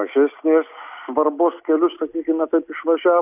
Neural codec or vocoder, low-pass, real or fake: none; 3.6 kHz; real